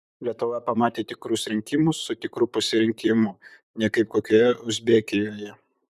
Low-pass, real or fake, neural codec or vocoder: 14.4 kHz; fake; vocoder, 44.1 kHz, 128 mel bands, Pupu-Vocoder